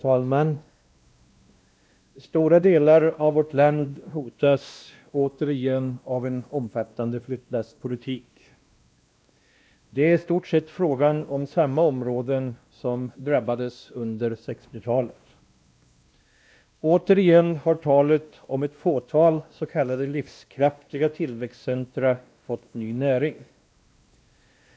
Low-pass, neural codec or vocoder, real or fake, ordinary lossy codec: none; codec, 16 kHz, 1 kbps, X-Codec, WavLM features, trained on Multilingual LibriSpeech; fake; none